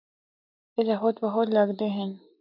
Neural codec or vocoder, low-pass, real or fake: none; 5.4 kHz; real